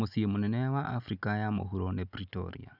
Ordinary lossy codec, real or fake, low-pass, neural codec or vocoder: none; real; 5.4 kHz; none